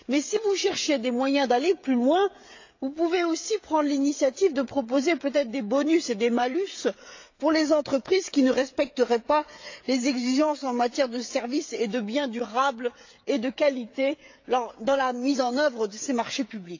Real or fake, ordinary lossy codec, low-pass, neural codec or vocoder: fake; AAC, 48 kbps; 7.2 kHz; codec, 16 kHz, 16 kbps, FreqCodec, smaller model